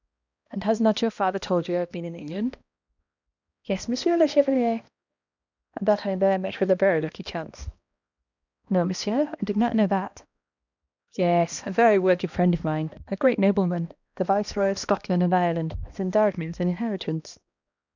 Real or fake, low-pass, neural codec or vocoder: fake; 7.2 kHz; codec, 16 kHz, 1 kbps, X-Codec, HuBERT features, trained on balanced general audio